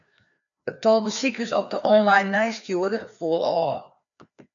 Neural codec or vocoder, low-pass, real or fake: codec, 16 kHz, 2 kbps, FreqCodec, larger model; 7.2 kHz; fake